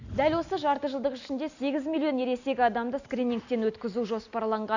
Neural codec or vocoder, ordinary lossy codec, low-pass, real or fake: none; none; 7.2 kHz; real